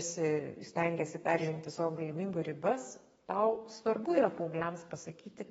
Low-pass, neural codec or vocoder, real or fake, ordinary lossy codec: 14.4 kHz; codec, 32 kHz, 1.9 kbps, SNAC; fake; AAC, 24 kbps